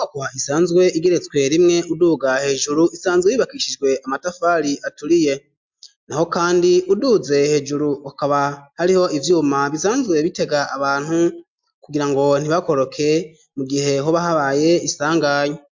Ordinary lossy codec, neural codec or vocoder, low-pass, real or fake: MP3, 64 kbps; none; 7.2 kHz; real